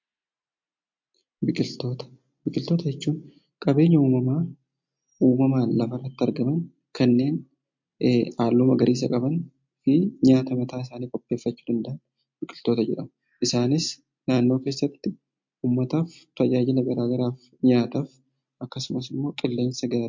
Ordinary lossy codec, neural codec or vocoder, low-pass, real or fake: MP3, 48 kbps; none; 7.2 kHz; real